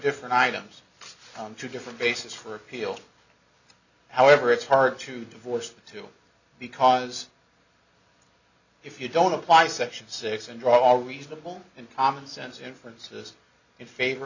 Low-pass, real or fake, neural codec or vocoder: 7.2 kHz; real; none